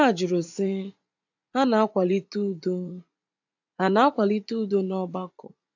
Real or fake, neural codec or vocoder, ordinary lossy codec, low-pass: fake; vocoder, 44.1 kHz, 80 mel bands, Vocos; AAC, 48 kbps; 7.2 kHz